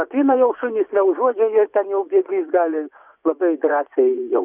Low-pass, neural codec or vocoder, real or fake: 3.6 kHz; vocoder, 24 kHz, 100 mel bands, Vocos; fake